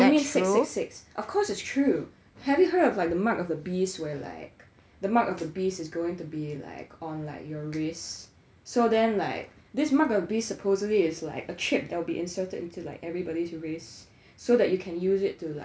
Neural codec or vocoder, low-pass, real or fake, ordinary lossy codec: none; none; real; none